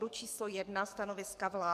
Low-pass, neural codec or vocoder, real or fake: 14.4 kHz; codec, 44.1 kHz, 7.8 kbps, DAC; fake